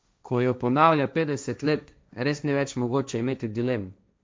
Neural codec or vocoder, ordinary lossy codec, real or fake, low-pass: codec, 16 kHz, 1.1 kbps, Voila-Tokenizer; none; fake; none